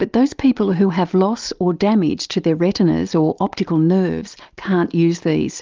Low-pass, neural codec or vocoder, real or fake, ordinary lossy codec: 7.2 kHz; none; real; Opus, 32 kbps